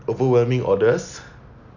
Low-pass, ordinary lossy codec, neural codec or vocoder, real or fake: 7.2 kHz; none; none; real